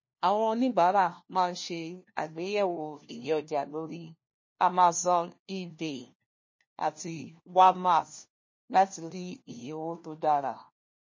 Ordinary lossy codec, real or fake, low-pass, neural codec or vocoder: MP3, 32 kbps; fake; 7.2 kHz; codec, 16 kHz, 1 kbps, FunCodec, trained on LibriTTS, 50 frames a second